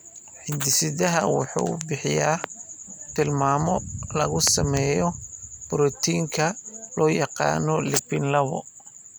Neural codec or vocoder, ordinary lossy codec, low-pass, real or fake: none; none; none; real